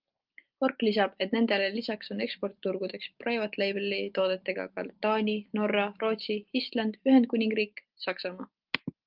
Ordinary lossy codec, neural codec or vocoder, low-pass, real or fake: Opus, 32 kbps; none; 5.4 kHz; real